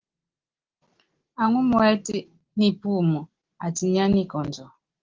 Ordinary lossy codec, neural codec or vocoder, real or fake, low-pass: Opus, 16 kbps; none; real; 7.2 kHz